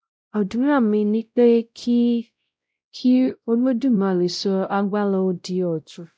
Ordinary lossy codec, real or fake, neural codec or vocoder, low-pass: none; fake; codec, 16 kHz, 0.5 kbps, X-Codec, WavLM features, trained on Multilingual LibriSpeech; none